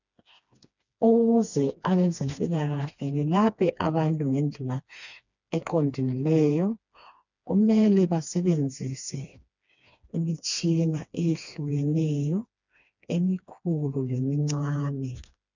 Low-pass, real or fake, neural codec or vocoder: 7.2 kHz; fake; codec, 16 kHz, 2 kbps, FreqCodec, smaller model